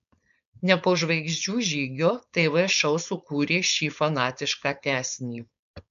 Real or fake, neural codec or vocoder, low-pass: fake; codec, 16 kHz, 4.8 kbps, FACodec; 7.2 kHz